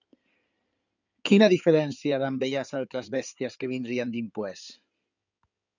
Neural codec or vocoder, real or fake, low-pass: codec, 16 kHz in and 24 kHz out, 2.2 kbps, FireRedTTS-2 codec; fake; 7.2 kHz